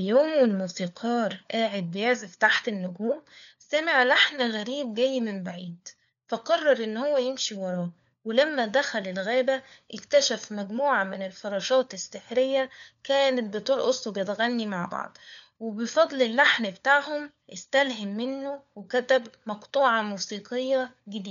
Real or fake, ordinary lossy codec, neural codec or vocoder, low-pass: fake; MP3, 96 kbps; codec, 16 kHz, 4 kbps, FunCodec, trained on LibriTTS, 50 frames a second; 7.2 kHz